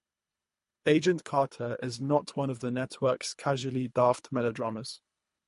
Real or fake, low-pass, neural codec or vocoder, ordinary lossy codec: fake; 10.8 kHz; codec, 24 kHz, 3 kbps, HILCodec; MP3, 48 kbps